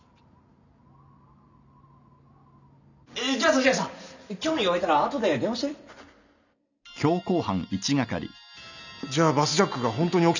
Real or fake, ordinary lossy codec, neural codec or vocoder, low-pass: real; none; none; 7.2 kHz